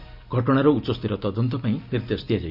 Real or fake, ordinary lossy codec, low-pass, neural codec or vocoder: real; AAC, 48 kbps; 5.4 kHz; none